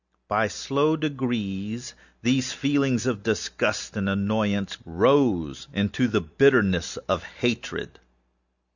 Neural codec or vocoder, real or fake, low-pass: none; real; 7.2 kHz